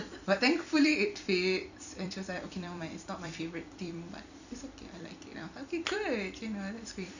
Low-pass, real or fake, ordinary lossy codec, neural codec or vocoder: 7.2 kHz; fake; none; vocoder, 44.1 kHz, 128 mel bands every 256 samples, BigVGAN v2